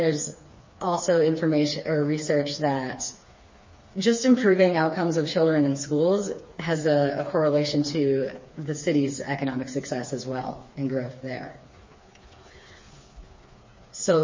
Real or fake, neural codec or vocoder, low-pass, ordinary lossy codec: fake; codec, 16 kHz, 4 kbps, FreqCodec, smaller model; 7.2 kHz; MP3, 32 kbps